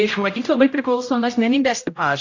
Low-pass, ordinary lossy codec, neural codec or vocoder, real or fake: 7.2 kHz; AAC, 48 kbps; codec, 16 kHz, 0.5 kbps, X-Codec, HuBERT features, trained on general audio; fake